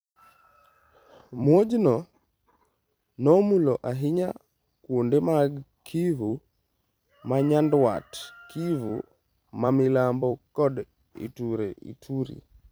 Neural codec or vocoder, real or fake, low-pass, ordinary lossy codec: vocoder, 44.1 kHz, 128 mel bands every 256 samples, BigVGAN v2; fake; none; none